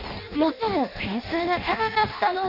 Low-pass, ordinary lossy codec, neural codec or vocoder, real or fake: 5.4 kHz; none; codec, 16 kHz in and 24 kHz out, 0.6 kbps, FireRedTTS-2 codec; fake